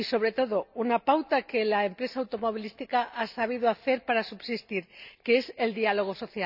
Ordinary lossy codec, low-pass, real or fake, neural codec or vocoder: none; 5.4 kHz; real; none